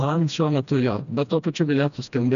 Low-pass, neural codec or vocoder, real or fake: 7.2 kHz; codec, 16 kHz, 1 kbps, FreqCodec, smaller model; fake